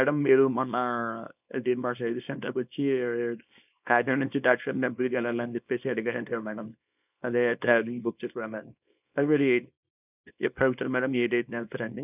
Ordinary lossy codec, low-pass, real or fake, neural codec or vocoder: none; 3.6 kHz; fake; codec, 24 kHz, 0.9 kbps, WavTokenizer, small release